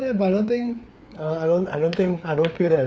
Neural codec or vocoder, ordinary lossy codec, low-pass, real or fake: codec, 16 kHz, 4 kbps, FreqCodec, larger model; none; none; fake